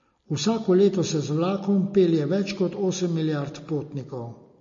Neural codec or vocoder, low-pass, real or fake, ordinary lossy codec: none; 7.2 kHz; real; MP3, 32 kbps